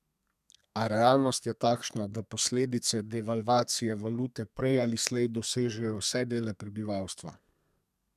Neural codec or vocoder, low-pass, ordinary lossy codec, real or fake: codec, 32 kHz, 1.9 kbps, SNAC; 14.4 kHz; none; fake